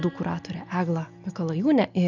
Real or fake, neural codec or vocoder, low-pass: real; none; 7.2 kHz